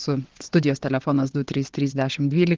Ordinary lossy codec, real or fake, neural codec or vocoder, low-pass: Opus, 16 kbps; fake; vocoder, 22.05 kHz, 80 mel bands, WaveNeXt; 7.2 kHz